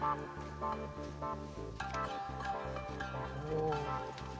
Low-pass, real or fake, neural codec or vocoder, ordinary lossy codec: none; real; none; none